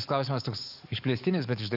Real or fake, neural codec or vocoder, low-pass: fake; codec, 44.1 kHz, 7.8 kbps, DAC; 5.4 kHz